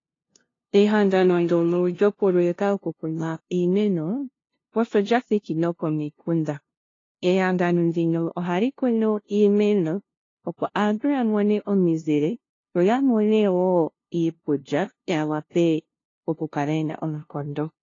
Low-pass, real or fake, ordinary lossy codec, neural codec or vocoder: 7.2 kHz; fake; AAC, 32 kbps; codec, 16 kHz, 0.5 kbps, FunCodec, trained on LibriTTS, 25 frames a second